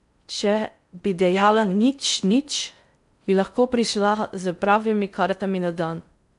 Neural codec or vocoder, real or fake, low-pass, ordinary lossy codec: codec, 16 kHz in and 24 kHz out, 0.6 kbps, FocalCodec, streaming, 4096 codes; fake; 10.8 kHz; MP3, 64 kbps